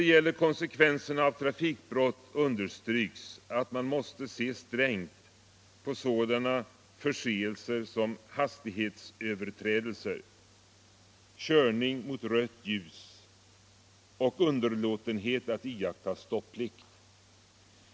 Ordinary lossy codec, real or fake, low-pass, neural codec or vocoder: none; real; none; none